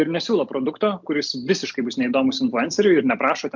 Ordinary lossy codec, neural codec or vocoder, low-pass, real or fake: MP3, 64 kbps; none; 7.2 kHz; real